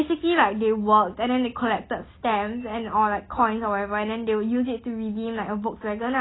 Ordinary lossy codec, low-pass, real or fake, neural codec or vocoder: AAC, 16 kbps; 7.2 kHz; real; none